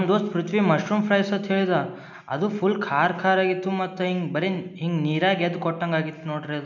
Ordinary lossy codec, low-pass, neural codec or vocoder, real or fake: none; 7.2 kHz; none; real